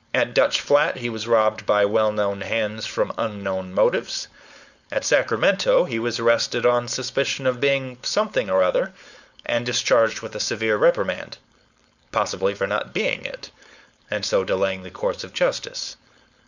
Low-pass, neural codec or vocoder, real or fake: 7.2 kHz; codec, 16 kHz, 4.8 kbps, FACodec; fake